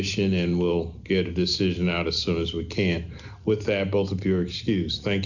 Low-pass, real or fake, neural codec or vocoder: 7.2 kHz; real; none